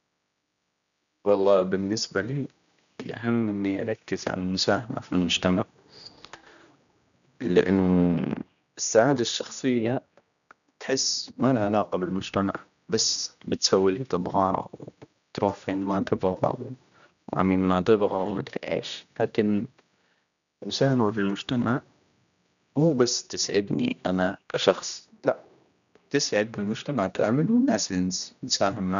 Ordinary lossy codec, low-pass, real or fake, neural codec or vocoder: none; 7.2 kHz; fake; codec, 16 kHz, 1 kbps, X-Codec, HuBERT features, trained on general audio